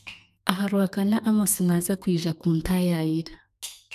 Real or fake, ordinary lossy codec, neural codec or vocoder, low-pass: fake; none; codec, 32 kHz, 1.9 kbps, SNAC; 14.4 kHz